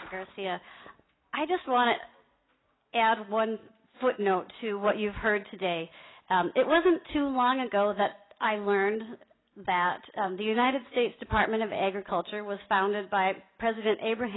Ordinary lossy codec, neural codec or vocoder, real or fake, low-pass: AAC, 16 kbps; none; real; 7.2 kHz